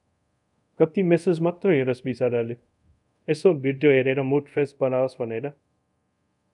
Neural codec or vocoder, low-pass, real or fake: codec, 24 kHz, 0.5 kbps, DualCodec; 10.8 kHz; fake